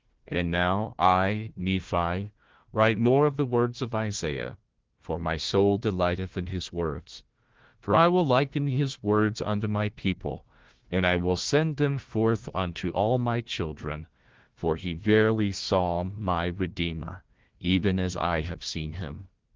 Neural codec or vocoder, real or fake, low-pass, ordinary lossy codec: codec, 16 kHz, 1 kbps, FunCodec, trained on Chinese and English, 50 frames a second; fake; 7.2 kHz; Opus, 16 kbps